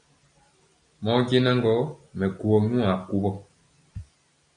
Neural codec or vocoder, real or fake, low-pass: none; real; 9.9 kHz